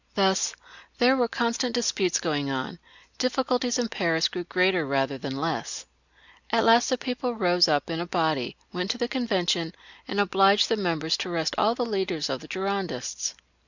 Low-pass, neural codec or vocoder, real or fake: 7.2 kHz; none; real